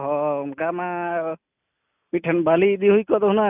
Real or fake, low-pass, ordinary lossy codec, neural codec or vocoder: real; 3.6 kHz; none; none